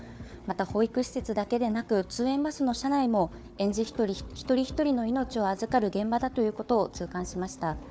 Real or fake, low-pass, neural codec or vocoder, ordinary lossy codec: fake; none; codec, 16 kHz, 4 kbps, FunCodec, trained on Chinese and English, 50 frames a second; none